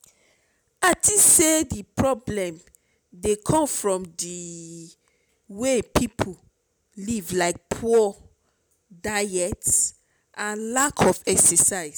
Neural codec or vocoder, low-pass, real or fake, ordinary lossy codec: none; none; real; none